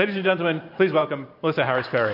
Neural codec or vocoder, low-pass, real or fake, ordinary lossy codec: vocoder, 44.1 kHz, 128 mel bands every 512 samples, BigVGAN v2; 5.4 kHz; fake; MP3, 48 kbps